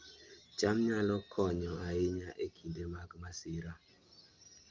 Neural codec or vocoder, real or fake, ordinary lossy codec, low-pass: none; real; Opus, 32 kbps; 7.2 kHz